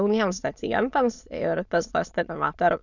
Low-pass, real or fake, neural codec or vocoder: 7.2 kHz; fake; autoencoder, 22.05 kHz, a latent of 192 numbers a frame, VITS, trained on many speakers